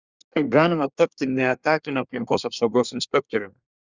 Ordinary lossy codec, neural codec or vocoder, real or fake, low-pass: Opus, 64 kbps; codec, 24 kHz, 1 kbps, SNAC; fake; 7.2 kHz